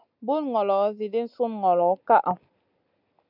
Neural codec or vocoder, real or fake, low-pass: none; real; 5.4 kHz